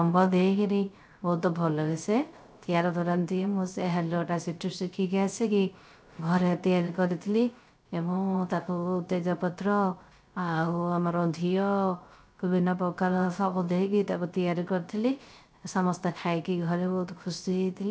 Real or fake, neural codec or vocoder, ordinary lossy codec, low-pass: fake; codec, 16 kHz, 0.3 kbps, FocalCodec; none; none